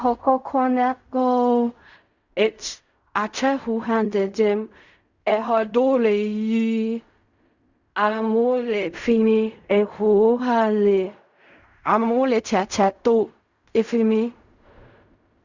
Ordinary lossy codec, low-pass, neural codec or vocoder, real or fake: Opus, 64 kbps; 7.2 kHz; codec, 16 kHz in and 24 kHz out, 0.4 kbps, LongCat-Audio-Codec, fine tuned four codebook decoder; fake